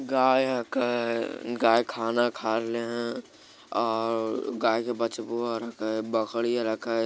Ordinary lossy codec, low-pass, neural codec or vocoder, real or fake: none; none; none; real